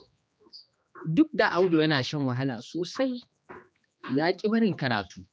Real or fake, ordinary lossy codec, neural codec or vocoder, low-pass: fake; none; codec, 16 kHz, 2 kbps, X-Codec, HuBERT features, trained on general audio; none